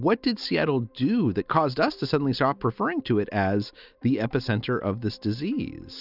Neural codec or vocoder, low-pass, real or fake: none; 5.4 kHz; real